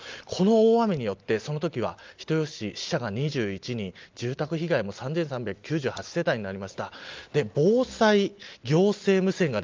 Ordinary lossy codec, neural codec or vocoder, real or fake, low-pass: Opus, 24 kbps; none; real; 7.2 kHz